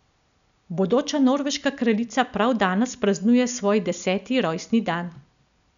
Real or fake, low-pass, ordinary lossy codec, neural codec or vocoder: real; 7.2 kHz; none; none